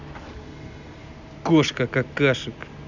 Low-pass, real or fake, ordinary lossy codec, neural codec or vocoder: 7.2 kHz; real; none; none